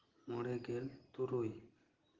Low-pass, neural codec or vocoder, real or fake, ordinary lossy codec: 7.2 kHz; none; real; Opus, 16 kbps